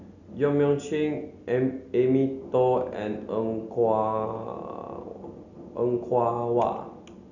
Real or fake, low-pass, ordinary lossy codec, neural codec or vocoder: real; 7.2 kHz; none; none